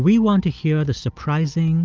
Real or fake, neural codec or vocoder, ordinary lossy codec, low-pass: real; none; Opus, 32 kbps; 7.2 kHz